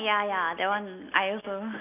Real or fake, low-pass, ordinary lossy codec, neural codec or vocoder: real; 3.6 kHz; none; none